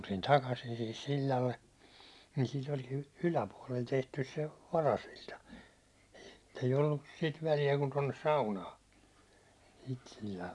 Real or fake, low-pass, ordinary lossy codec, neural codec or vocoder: real; none; none; none